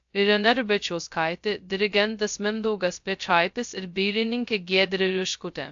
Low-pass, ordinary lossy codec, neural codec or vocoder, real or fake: 7.2 kHz; AAC, 64 kbps; codec, 16 kHz, 0.2 kbps, FocalCodec; fake